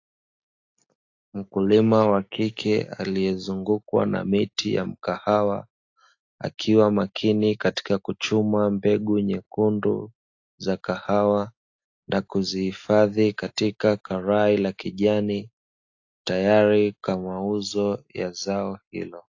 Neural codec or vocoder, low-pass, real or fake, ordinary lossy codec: none; 7.2 kHz; real; AAC, 48 kbps